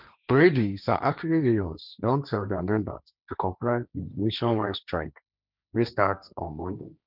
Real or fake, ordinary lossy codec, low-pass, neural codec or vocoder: fake; none; 5.4 kHz; codec, 16 kHz, 1.1 kbps, Voila-Tokenizer